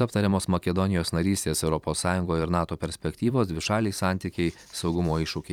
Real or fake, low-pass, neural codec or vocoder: fake; 19.8 kHz; vocoder, 44.1 kHz, 128 mel bands every 512 samples, BigVGAN v2